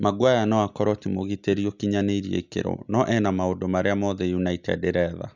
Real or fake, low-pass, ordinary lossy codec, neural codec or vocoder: real; 7.2 kHz; none; none